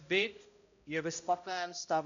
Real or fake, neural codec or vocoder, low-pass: fake; codec, 16 kHz, 0.5 kbps, X-Codec, HuBERT features, trained on balanced general audio; 7.2 kHz